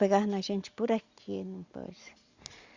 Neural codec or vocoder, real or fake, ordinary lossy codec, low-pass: none; real; Opus, 64 kbps; 7.2 kHz